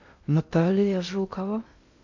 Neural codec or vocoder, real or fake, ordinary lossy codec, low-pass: codec, 16 kHz in and 24 kHz out, 0.6 kbps, FocalCodec, streaming, 2048 codes; fake; AAC, 48 kbps; 7.2 kHz